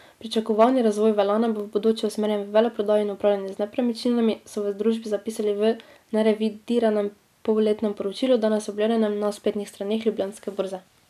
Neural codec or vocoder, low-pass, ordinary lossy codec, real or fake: none; 14.4 kHz; none; real